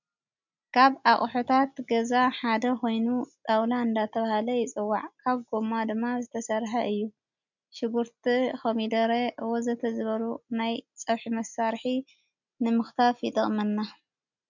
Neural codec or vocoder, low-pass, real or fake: none; 7.2 kHz; real